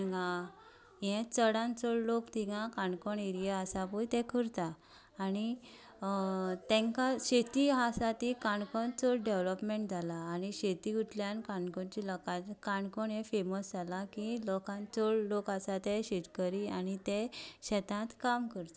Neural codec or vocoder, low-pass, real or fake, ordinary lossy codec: none; none; real; none